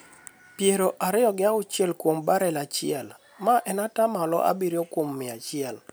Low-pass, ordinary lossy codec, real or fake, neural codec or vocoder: none; none; real; none